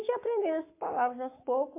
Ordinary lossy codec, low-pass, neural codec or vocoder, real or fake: MP3, 24 kbps; 3.6 kHz; codec, 44.1 kHz, 7.8 kbps, Pupu-Codec; fake